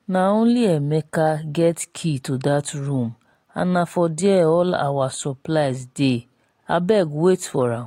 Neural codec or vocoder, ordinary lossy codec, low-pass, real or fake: none; AAC, 48 kbps; 19.8 kHz; real